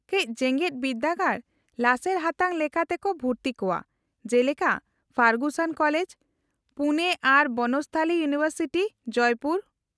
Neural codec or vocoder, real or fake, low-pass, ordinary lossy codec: none; real; none; none